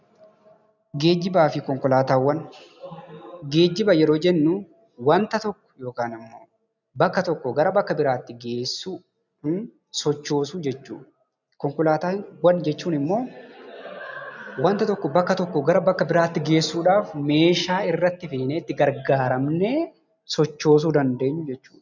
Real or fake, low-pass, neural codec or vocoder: real; 7.2 kHz; none